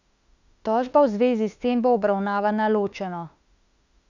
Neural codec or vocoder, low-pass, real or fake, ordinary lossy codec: autoencoder, 48 kHz, 32 numbers a frame, DAC-VAE, trained on Japanese speech; 7.2 kHz; fake; none